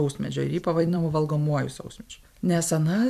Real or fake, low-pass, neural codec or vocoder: fake; 14.4 kHz; vocoder, 44.1 kHz, 128 mel bands every 256 samples, BigVGAN v2